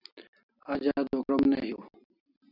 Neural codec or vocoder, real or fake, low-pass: none; real; 5.4 kHz